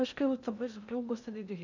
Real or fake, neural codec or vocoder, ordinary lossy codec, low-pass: fake; codec, 16 kHz in and 24 kHz out, 0.8 kbps, FocalCodec, streaming, 65536 codes; none; 7.2 kHz